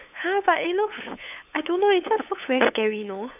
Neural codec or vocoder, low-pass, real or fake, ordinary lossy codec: codec, 16 kHz, 8 kbps, FunCodec, trained on LibriTTS, 25 frames a second; 3.6 kHz; fake; none